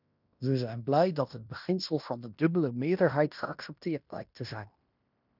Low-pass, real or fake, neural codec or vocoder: 5.4 kHz; fake; codec, 16 kHz in and 24 kHz out, 0.9 kbps, LongCat-Audio-Codec, fine tuned four codebook decoder